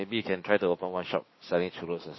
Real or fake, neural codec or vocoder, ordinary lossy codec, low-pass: fake; codec, 16 kHz, 6 kbps, DAC; MP3, 24 kbps; 7.2 kHz